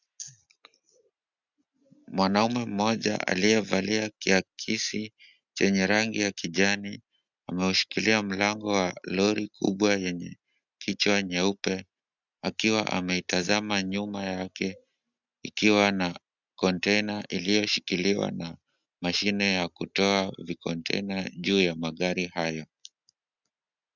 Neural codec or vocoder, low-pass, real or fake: none; 7.2 kHz; real